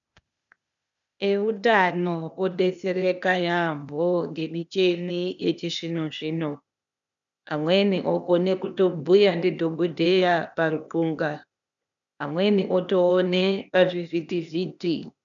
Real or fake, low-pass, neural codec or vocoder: fake; 7.2 kHz; codec, 16 kHz, 0.8 kbps, ZipCodec